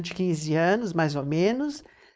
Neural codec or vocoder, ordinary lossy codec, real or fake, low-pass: codec, 16 kHz, 4.8 kbps, FACodec; none; fake; none